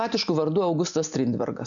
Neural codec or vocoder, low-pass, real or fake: none; 7.2 kHz; real